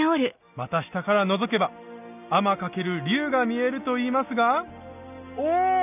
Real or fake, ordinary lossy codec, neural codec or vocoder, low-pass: real; none; none; 3.6 kHz